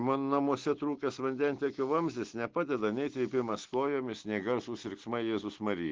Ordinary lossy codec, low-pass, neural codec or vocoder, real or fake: Opus, 16 kbps; 7.2 kHz; none; real